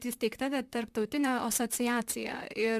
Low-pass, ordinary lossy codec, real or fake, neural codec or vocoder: 14.4 kHz; Opus, 64 kbps; fake; vocoder, 44.1 kHz, 128 mel bands, Pupu-Vocoder